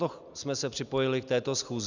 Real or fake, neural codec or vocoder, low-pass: real; none; 7.2 kHz